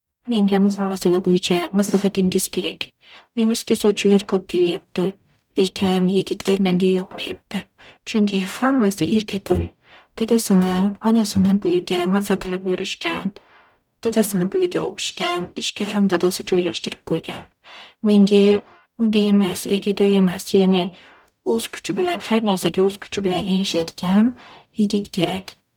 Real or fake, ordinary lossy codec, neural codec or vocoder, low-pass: fake; none; codec, 44.1 kHz, 0.9 kbps, DAC; 19.8 kHz